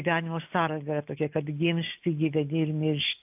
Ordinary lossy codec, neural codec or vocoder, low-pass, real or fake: AAC, 32 kbps; none; 3.6 kHz; real